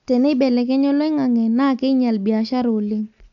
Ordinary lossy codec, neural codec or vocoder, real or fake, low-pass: none; none; real; 7.2 kHz